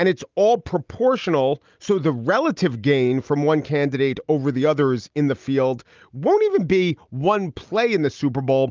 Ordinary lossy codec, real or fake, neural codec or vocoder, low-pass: Opus, 24 kbps; real; none; 7.2 kHz